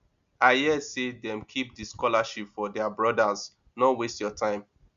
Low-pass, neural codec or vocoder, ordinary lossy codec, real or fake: 7.2 kHz; none; Opus, 64 kbps; real